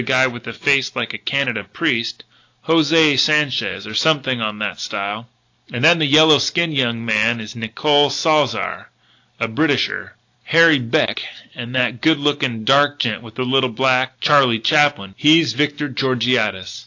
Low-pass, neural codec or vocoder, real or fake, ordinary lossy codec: 7.2 kHz; none; real; AAC, 48 kbps